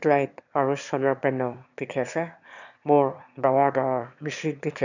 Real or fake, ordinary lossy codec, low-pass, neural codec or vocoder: fake; none; 7.2 kHz; autoencoder, 22.05 kHz, a latent of 192 numbers a frame, VITS, trained on one speaker